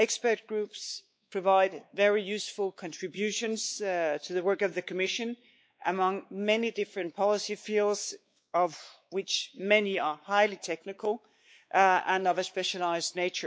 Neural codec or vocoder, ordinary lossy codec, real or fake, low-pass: codec, 16 kHz, 4 kbps, X-Codec, WavLM features, trained on Multilingual LibriSpeech; none; fake; none